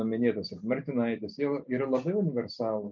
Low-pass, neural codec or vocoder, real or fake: 7.2 kHz; none; real